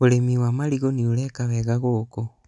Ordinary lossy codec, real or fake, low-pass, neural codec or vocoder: none; real; 10.8 kHz; none